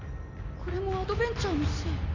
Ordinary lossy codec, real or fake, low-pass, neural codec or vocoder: none; real; 7.2 kHz; none